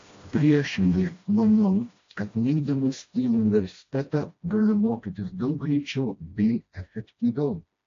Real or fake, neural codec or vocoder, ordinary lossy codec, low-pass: fake; codec, 16 kHz, 1 kbps, FreqCodec, smaller model; AAC, 48 kbps; 7.2 kHz